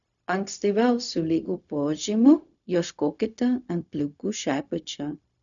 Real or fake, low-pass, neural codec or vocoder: fake; 7.2 kHz; codec, 16 kHz, 0.4 kbps, LongCat-Audio-Codec